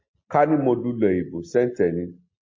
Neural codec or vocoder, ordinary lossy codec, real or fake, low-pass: none; MP3, 32 kbps; real; 7.2 kHz